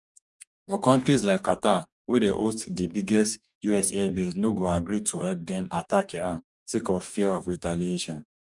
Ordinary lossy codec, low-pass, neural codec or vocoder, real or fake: none; 10.8 kHz; codec, 44.1 kHz, 2.6 kbps, DAC; fake